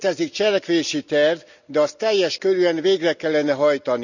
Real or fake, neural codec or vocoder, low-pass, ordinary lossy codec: real; none; 7.2 kHz; none